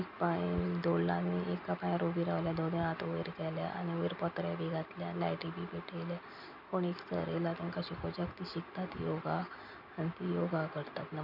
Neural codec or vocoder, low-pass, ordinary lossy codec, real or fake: none; 5.4 kHz; none; real